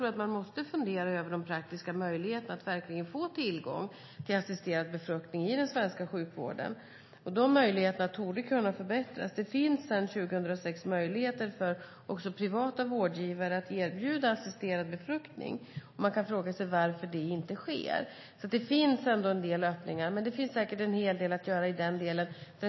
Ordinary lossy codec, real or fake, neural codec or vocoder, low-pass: MP3, 24 kbps; real; none; 7.2 kHz